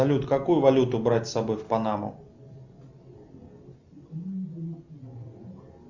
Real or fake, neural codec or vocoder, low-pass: real; none; 7.2 kHz